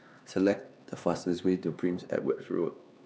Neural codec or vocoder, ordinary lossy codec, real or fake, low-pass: codec, 16 kHz, 2 kbps, X-Codec, HuBERT features, trained on LibriSpeech; none; fake; none